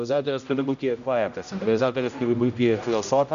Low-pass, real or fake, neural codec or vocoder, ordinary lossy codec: 7.2 kHz; fake; codec, 16 kHz, 0.5 kbps, X-Codec, HuBERT features, trained on general audio; MP3, 96 kbps